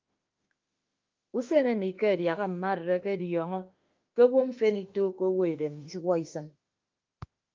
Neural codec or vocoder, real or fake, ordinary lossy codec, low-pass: autoencoder, 48 kHz, 32 numbers a frame, DAC-VAE, trained on Japanese speech; fake; Opus, 24 kbps; 7.2 kHz